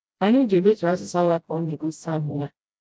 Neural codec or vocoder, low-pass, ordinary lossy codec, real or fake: codec, 16 kHz, 0.5 kbps, FreqCodec, smaller model; none; none; fake